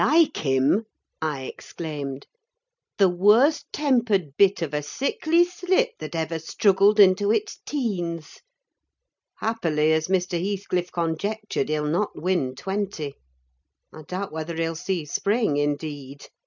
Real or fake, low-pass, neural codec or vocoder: real; 7.2 kHz; none